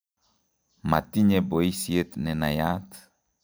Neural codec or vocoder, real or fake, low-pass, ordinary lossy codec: none; real; none; none